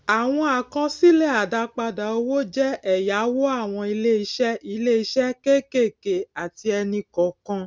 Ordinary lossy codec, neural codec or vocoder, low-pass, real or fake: none; none; none; real